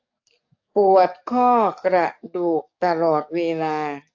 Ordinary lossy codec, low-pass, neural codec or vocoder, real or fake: AAC, 48 kbps; 7.2 kHz; codec, 44.1 kHz, 7.8 kbps, DAC; fake